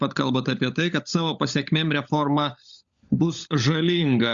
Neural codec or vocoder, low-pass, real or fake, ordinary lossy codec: codec, 16 kHz, 16 kbps, FunCodec, trained on LibriTTS, 50 frames a second; 7.2 kHz; fake; Opus, 64 kbps